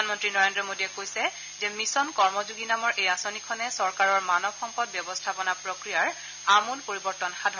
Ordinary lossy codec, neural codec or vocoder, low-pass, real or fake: none; none; 7.2 kHz; real